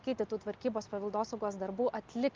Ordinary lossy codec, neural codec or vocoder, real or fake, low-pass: Opus, 24 kbps; none; real; 7.2 kHz